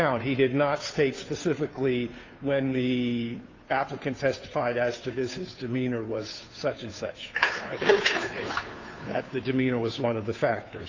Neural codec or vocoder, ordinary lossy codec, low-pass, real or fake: codec, 16 kHz, 2 kbps, FunCodec, trained on Chinese and English, 25 frames a second; AAC, 48 kbps; 7.2 kHz; fake